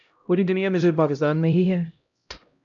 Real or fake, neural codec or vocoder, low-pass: fake; codec, 16 kHz, 0.5 kbps, X-Codec, HuBERT features, trained on LibriSpeech; 7.2 kHz